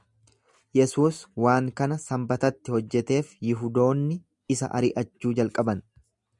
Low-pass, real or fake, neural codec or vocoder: 10.8 kHz; real; none